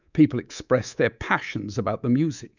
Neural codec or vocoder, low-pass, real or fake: codec, 24 kHz, 3.1 kbps, DualCodec; 7.2 kHz; fake